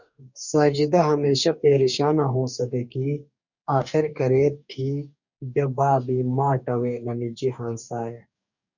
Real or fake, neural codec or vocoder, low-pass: fake; codec, 44.1 kHz, 2.6 kbps, DAC; 7.2 kHz